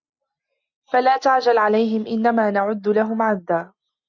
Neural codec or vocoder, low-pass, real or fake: none; 7.2 kHz; real